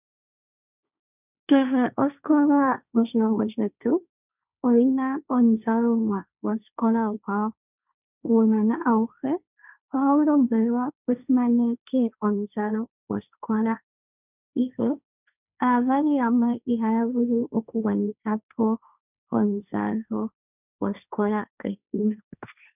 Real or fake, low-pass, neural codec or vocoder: fake; 3.6 kHz; codec, 16 kHz, 1.1 kbps, Voila-Tokenizer